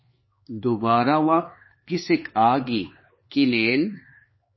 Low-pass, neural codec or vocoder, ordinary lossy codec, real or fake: 7.2 kHz; codec, 16 kHz, 4 kbps, X-Codec, HuBERT features, trained on LibriSpeech; MP3, 24 kbps; fake